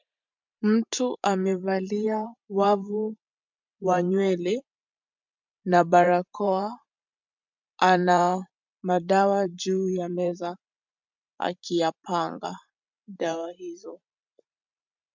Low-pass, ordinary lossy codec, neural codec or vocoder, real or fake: 7.2 kHz; MP3, 64 kbps; vocoder, 44.1 kHz, 128 mel bands every 512 samples, BigVGAN v2; fake